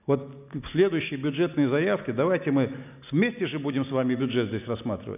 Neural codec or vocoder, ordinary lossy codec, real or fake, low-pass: none; none; real; 3.6 kHz